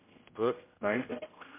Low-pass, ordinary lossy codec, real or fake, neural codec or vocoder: 3.6 kHz; MP3, 32 kbps; fake; codec, 16 kHz, 0.5 kbps, X-Codec, HuBERT features, trained on general audio